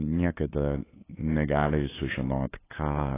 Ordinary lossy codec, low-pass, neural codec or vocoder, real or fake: AAC, 16 kbps; 3.6 kHz; codec, 16 kHz, 8 kbps, FunCodec, trained on LibriTTS, 25 frames a second; fake